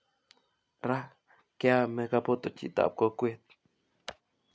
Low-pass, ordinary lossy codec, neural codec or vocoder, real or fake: none; none; none; real